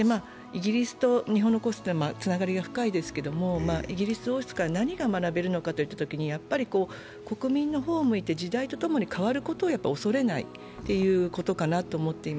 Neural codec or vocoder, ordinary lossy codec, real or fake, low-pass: none; none; real; none